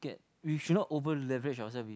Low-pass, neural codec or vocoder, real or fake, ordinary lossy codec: none; none; real; none